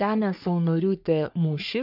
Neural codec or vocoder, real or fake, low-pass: codec, 44.1 kHz, 3.4 kbps, Pupu-Codec; fake; 5.4 kHz